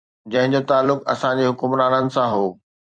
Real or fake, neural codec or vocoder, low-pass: fake; vocoder, 44.1 kHz, 128 mel bands every 256 samples, BigVGAN v2; 9.9 kHz